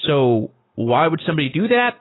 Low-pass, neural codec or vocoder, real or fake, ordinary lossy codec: 7.2 kHz; none; real; AAC, 16 kbps